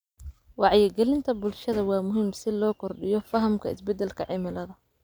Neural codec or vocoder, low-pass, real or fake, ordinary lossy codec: none; none; real; none